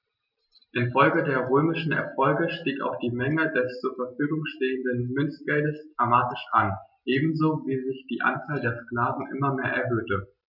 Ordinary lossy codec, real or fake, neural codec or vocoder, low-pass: none; real; none; 5.4 kHz